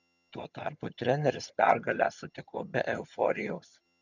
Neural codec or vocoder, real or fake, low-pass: vocoder, 22.05 kHz, 80 mel bands, HiFi-GAN; fake; 7.2 kHz